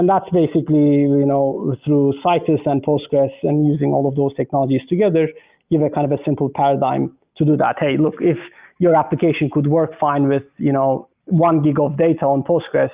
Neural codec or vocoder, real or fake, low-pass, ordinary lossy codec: none; real; 3.6 kHz; Opus, 24 kbps